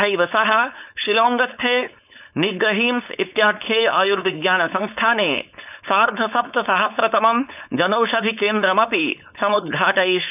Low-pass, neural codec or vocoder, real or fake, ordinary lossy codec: 3.6 kHz; codec, 16 kHz, 4.8 kbps, FACodec; fake; none